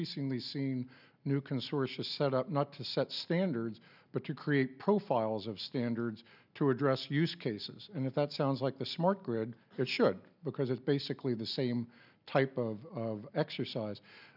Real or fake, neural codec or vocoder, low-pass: real; none; 5.4 kHz